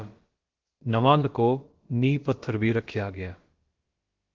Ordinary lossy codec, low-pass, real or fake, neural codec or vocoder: Opus, 16 kbps; 7.2 kHz; fake; codec, 16 kHz, about 1 kbps, DyCAST, with the encoder's durations